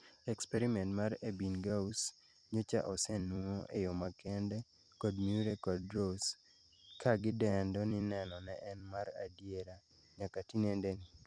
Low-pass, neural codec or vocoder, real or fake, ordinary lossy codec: 9.9 kHz; vocoder, 44.1 kHz, 128 mel bands every 256 samples, BigVGAN v2; fake; none